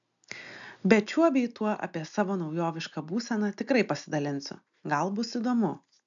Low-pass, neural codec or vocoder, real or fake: 7.2 kHz; none; real